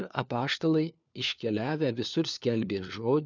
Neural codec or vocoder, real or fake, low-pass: codec, 16 kHz, 4 kbps, FunCodec, trained on LibriTTS, 50 frames a second; fake; 7.2 kHz